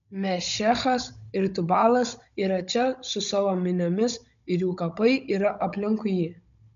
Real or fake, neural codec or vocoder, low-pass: fake; codec, 16 kHz, 16 kbps, FunCodec, trained on Chinese and English, 50 frames a second; 7.2 kHz